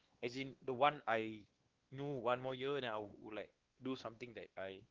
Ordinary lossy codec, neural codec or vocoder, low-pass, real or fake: Opus, 16 kbps; codec, 16 kHz, 2 kbps, X-Codec, WavLM features, trained on Multilingual LibriSpeech; 7.2 kHz; fake